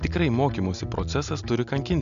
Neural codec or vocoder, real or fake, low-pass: none; real; 7.2 kHz